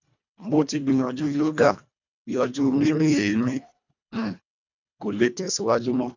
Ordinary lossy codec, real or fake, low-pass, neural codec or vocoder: none; fake; 7.2 kHz; codec, 24 kHz, 1.5 kbps, HILCodec